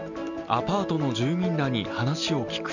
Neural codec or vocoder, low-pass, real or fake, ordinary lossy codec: none; 7.2 kHz; real; none